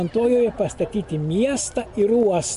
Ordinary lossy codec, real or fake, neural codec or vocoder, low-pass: MP3, 64 kbps; real; none; 10.8 kHz